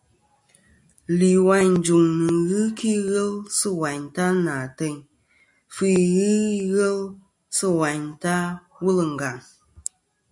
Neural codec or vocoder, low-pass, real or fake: none; 10.8 kHz; real